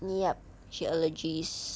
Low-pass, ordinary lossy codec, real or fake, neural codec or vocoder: none; none; real; none